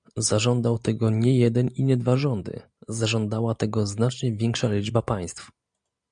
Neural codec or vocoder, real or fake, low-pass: none; real; 9.9 kHz